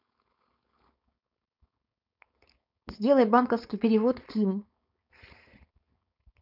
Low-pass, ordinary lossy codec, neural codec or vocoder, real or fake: 5.4 kHz; none; codec, 16 kHz, 4.8 kbps, FACodec; fake